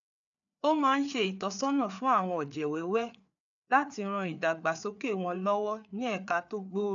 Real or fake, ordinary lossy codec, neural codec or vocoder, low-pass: fake; none; codec, 16 kHz, 4 kbps, FreqCodec, larger model; 7.2 kHz